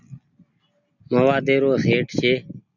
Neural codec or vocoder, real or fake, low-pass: none; real; 7.2 kHz